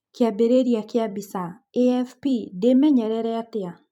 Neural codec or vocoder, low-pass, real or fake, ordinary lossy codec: vocoder, 44.1 kHz, 128 mel bands every 256 samples, BigVGAN v2; 19.8 kHz; fake; none